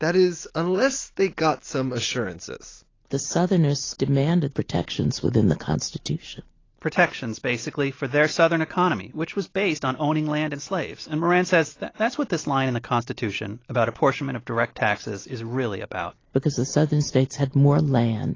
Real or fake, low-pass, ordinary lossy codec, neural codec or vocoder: real; 7.2 kHz; AAC, 32 kbps; none